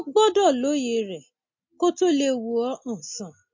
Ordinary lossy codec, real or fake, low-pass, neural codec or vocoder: MP3, 48 kbps; real; 7.2 kHz; none